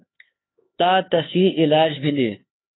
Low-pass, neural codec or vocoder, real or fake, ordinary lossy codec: 7.2 kHz; codec, 16 kHz, 4 kbps, X-Codec, HuBERT features, trained on LibriSpeech; fake; AAC, 16 kbps